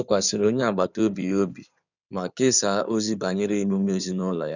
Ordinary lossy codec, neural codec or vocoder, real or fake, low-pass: none; codec, 16 kHz in and 24 kHz out, 2.2 kbps, FireRedTTS-2 codec; fake; 7.2 kHz